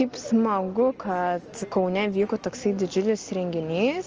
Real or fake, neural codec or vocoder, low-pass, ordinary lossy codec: real; none; 7.2 kHz; Opus, 24 kbps